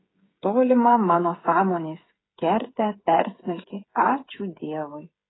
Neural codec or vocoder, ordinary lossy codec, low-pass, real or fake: codec, 16 kHz, 8 kbps, FreqCodec, smaller model; AAC, 16 kbps; 7.2 kHz; fake